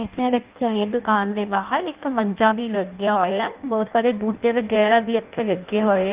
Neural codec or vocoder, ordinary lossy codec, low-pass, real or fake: codec, 16 kHz in and 24 kHz out, 0.6 kbps, FireRedTTS-2 codec; Opus, 32 kbps; 3.6 kHz; fake